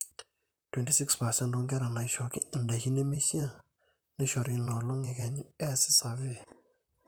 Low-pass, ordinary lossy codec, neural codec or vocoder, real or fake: none; none; vocoder, 44.1 kHz, 128 mel bands, Pupu-Vocoder; fake